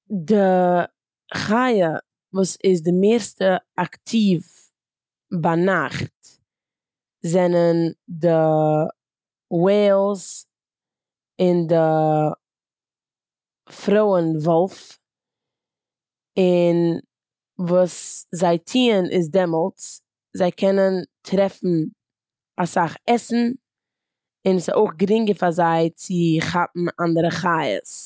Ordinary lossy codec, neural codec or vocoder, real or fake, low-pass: none; none; real; none